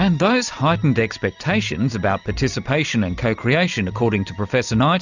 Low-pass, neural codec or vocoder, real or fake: 7.2 kHz; none; real